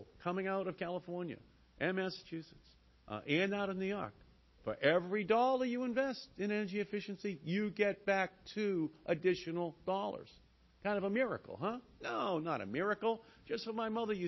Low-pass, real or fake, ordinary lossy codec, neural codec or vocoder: 7.2 kHz; real; MP3, 24 kbps; none